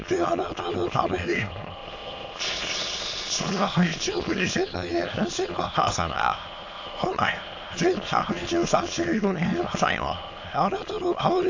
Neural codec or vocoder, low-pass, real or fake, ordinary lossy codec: autoencoder, 22.05 kHz, a latent of 192 numbers a frame, VITS, trained on many speakers; 7.2 kHz; fake; AAC, 48 kbps